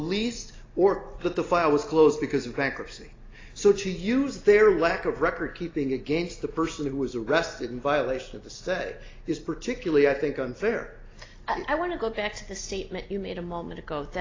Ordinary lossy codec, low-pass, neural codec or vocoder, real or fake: AAC, 32 kbps; 7.2 kHz; none; real